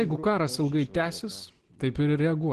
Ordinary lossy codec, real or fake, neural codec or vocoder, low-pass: Opus, 16 kbps; real; none; 10.8 kHz